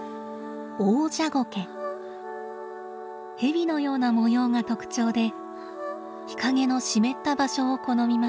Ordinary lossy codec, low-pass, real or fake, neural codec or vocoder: none; none; real; none